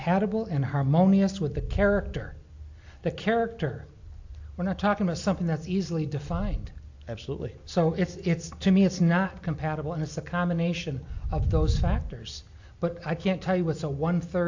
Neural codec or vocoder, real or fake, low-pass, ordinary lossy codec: none; real; 7.2 kHz; AAC, 48 kbps